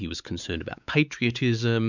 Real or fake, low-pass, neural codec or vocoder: fake; 7.2 kHz; codec, 16 kHz, 4 kbps, X-Codec, WavLM features, trained on Multilingual LibriSpeech